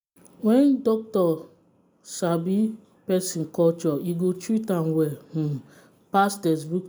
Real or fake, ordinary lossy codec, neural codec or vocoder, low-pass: real; none; none; none